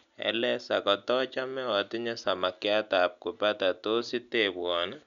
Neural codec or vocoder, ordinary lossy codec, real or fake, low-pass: none; none; real; 7.2 kHz